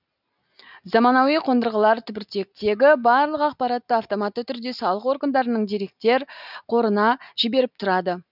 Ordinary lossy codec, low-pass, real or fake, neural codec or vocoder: none; 5.4 kHz; real; none